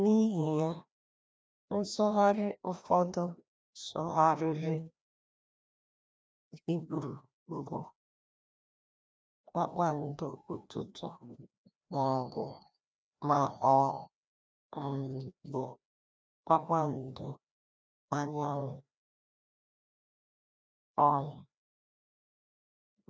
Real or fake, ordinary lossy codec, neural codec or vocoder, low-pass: fake; none; codec, 16 kHz, 1 kbps, FreqCodec, larger model; none